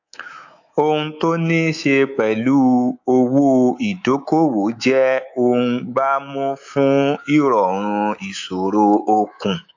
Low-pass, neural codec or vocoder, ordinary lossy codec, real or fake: 7.2 kHz; codec, 24 kHz, 3.1 kbps, DualCodec; AAC, 48 kbps; fake